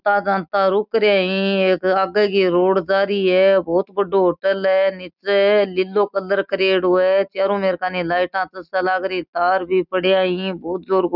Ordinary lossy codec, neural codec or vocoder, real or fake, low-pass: none; none; real; 5.4 kHz